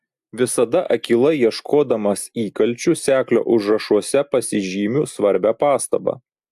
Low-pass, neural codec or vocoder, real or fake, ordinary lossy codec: 14.4 kHz; none; real; AAC, 96 kbps